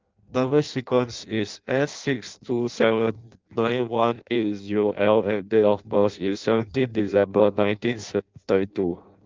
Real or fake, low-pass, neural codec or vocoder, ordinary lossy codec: fake; 7.2 kHz; codec, 16 kHz in and 24 kHz out, 0.6 kbps, FireRedTTS-2 codec; Opus, 24 kbps